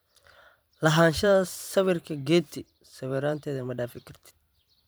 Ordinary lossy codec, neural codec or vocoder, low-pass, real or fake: none; vocoder, 44.1 kHz, 128 mel bands every 512 samples, BigVGAN v2; none; fake